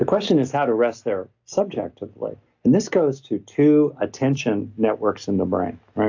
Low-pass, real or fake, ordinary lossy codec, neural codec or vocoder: 7.2 kHz; real; AAC, 48 kbps; none